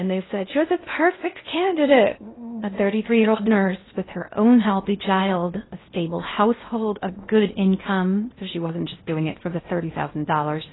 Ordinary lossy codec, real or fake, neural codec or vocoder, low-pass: AAC, 16 kbps; fake; codec, 16 kHz in and 24 kHz out, 0.8 kbps, FocalCodec, streaming, 65536 codes; 7.2 kHz